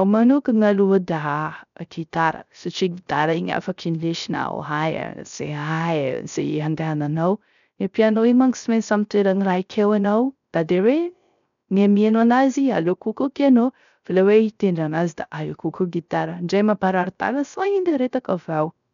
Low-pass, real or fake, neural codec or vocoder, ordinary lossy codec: 7.2 kHz; fake; codec, 16 kHz, 0.3 kbps, FocalCodec; none